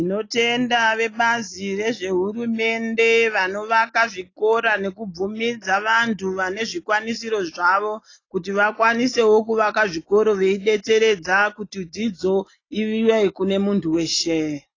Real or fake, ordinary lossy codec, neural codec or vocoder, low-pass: real; AAC, 32 kbps; none; 7.2 kHz